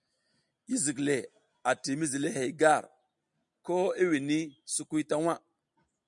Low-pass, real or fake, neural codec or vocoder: 10.8 kHz; real; none